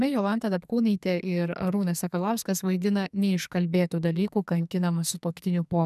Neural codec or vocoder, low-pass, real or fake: codec, 44.1 kHz, 2.6 kbps, SNAC; 14.4 kHz; fake